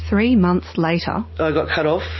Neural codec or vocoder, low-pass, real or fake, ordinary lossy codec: none; 7.2 kHz; real; MP3, 24 kbps